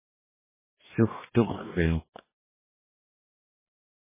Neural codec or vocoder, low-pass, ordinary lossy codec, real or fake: codec, 44.1 kHz, 2.6 kbps, DAC; 3.6 kHz; MP3, 16 kbps; fake